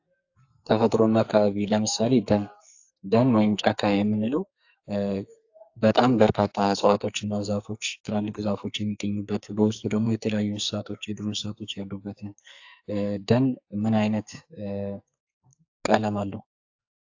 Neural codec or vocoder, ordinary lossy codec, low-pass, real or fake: codec, 44.1 kHz, 2.6 kbps, SNAC; AAC, 48 kbps; 7.2 kHz; fake